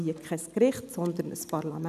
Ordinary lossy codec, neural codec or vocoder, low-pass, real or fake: none; none; 14.4 kHz; real